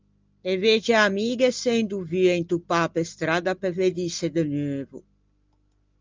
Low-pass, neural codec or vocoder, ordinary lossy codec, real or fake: 7.2 kHz; none; Opus, 32 kbps; real